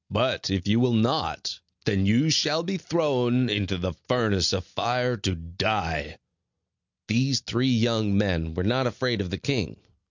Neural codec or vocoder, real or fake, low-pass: none; real; 7.2 kHz